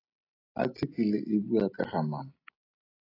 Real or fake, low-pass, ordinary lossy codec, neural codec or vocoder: real; 5.4 kHz; AAC, 24 kbps; none